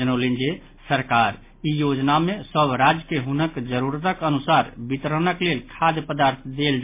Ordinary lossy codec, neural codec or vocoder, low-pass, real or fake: MP3, 24 kbps; none; 3.6 kHz; real